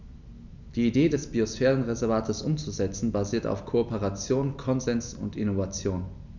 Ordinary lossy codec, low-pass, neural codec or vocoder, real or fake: none; 7.2 kHz; none; real